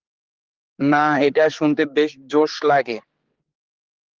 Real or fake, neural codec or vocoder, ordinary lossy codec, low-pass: fake; codec, 16 kHz, 4 kbps, X-Codec, HuBERT features, trained on general audio; Opus, 16 kbps; 7.2 kHz